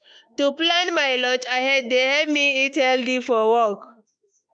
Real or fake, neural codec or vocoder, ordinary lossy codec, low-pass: fake; autoencoder, 48 kHz, 32 numbers a frame, DAC-VAE, trained on Japanese speech; none; 9.9 kHz